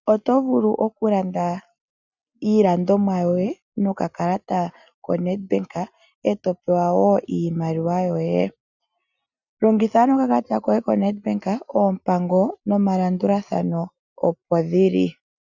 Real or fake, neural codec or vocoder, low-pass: real; none; 7.2 kHz